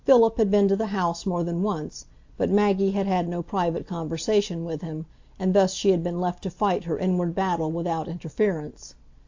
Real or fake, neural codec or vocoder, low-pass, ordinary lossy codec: real; none; 7.2 kHz; AAC, 48 kbps